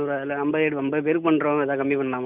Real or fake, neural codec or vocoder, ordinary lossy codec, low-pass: real; none; none; 3.6 kHz